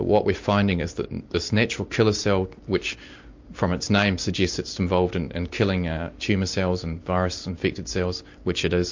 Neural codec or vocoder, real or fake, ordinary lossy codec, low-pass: none; real; MP3, 48 kbps; 7.2 kHz